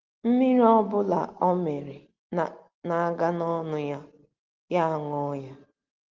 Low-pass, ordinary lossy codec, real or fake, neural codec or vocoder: 7.2 kHz; Opus, 16 kbps; real; none